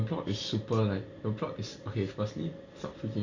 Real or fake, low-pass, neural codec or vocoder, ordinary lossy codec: real; 7.2 kHz; none; none